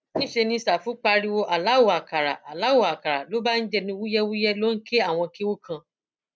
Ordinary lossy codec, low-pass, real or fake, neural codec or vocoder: none; none; real; none